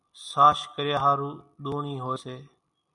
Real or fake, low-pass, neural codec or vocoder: real; 10.8 kHz; none